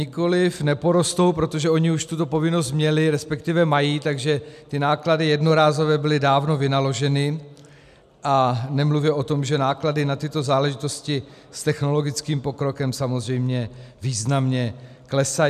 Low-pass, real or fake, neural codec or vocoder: 14.4 kHz; real; none